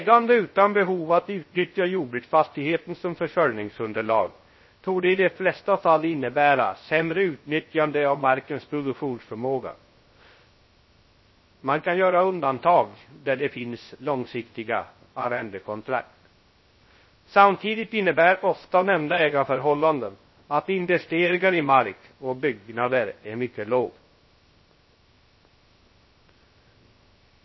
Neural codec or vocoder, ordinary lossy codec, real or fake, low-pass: codec, 16 kHz, 0.3 kbps, FocalCodec; MP3, 24 kbps; fake; 7.2 kHz